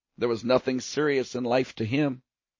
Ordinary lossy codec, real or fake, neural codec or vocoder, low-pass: MP3, 32 kbps; real; none; 7.2 kHz